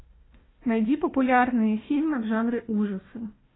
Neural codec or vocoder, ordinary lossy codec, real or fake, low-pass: codec, 16 kHz, 1 kbps, FunCodec, trained on Chinese and English, 50 frames a second; AAC, 16 kbps; fake; 7.2 kHz